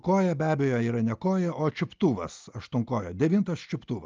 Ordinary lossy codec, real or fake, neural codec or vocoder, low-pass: Opus, 24 kbps; real; none; 7.2 kHz